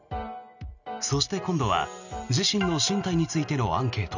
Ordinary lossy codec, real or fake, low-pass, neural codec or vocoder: none; real; 7.2 kHz; none